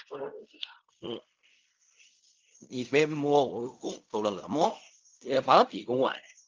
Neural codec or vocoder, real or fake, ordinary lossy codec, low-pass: codec, 16 kHz in and 24 kHz out, 0.4 kbps, LongCat-Audio-Codec, fine tuned four codebook decoder; fake; Opus, 24 kbps; 7.2 kHz